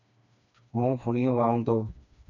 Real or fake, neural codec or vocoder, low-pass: fake; codec, 16 kHz, 2 kbps, FreqCodec, smaller model; 7.2 kHz